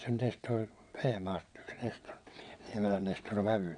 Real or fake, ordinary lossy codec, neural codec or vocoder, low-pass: fake; none; vocoder, 22.05 kHz, 80 mel bands, WaveNeXt; 9.9 kHz